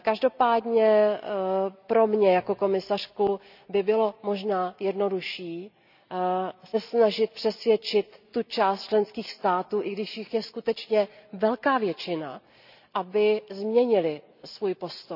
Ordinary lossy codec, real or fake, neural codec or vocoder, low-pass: none; real; none; 5.4 kHz